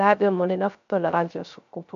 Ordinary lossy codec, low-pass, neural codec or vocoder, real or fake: MP3, 64 kbps; 7.2 kHz; codec, 16 kHz, 0.3 kbps, FocalCodec; fake